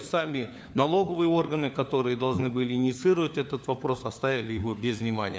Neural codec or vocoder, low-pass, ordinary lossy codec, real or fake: codec, 16 kHz, 4 kbps, FunCodec, trained on LibriTTS, 50 frames a second; none; none; fake